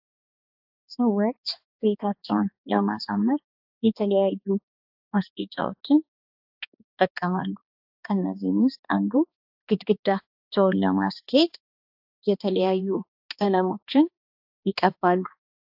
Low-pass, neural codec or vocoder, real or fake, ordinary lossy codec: 5.4 kHz; codec, 16 kHz, 2 kbps, X-Codec, HuBERT features, trained on balanced general audio; fake; AAC, 48 kbps